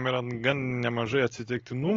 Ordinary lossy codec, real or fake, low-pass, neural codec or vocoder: AAC, 32 kbps; real; 7.2 kHz; none